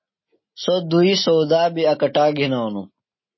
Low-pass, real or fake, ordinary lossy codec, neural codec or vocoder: 7.2 kHz; real; MP3, 24 kbps; none